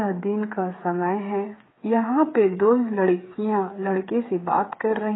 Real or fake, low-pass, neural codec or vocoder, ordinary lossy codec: fake; 7.2 kHz; codec, 16 kHz, 8 kbps, FreqCodec, smaller model; AAC, 16 kbps